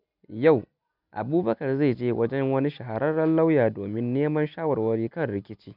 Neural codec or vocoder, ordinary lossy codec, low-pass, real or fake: none; none; 5.4 kHz; real